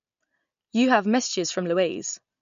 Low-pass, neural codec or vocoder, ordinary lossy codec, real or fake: 7.2 kHz; none; MP3, 48 kbps; real